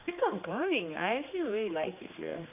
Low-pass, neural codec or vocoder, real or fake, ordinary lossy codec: 3.6 kHz; codec, 16 kHz, 2 kbps, X-Codec, HuBERT features, trained on general audio; fake; none